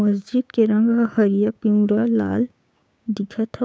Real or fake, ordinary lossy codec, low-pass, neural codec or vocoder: fake; none; none; codec, 16 kHz, 6 kbps, DAC